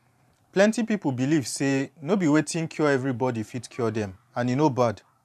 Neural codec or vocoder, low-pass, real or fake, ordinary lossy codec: vocoder, 44.1 kHz, 128 mel bands every 512 samples, BigVGAN v2; 14.4 kHz; fake; none